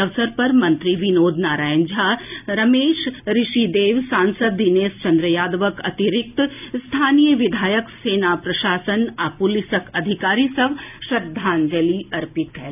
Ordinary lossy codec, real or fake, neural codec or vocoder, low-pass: none; real; none; 3.6 kHz